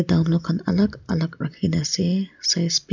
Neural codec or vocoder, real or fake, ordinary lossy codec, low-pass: none; real; none; 7.2 kHz